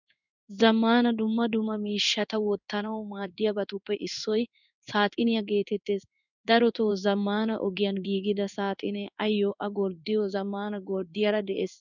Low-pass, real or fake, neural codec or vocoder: 7.2 kHz; fake; codec, 16 kHz in and 24 kHz out, 1 kbps, XY-Tokenizer